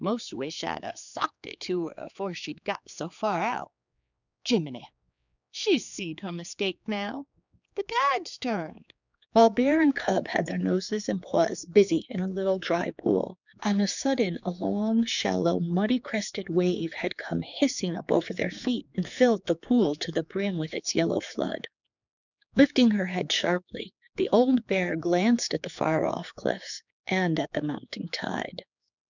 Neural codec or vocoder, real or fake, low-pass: codec, 16 kHz, 4 kbps, X-Codec, HuBERT features, trained on general audio; fake; 7.2 kHz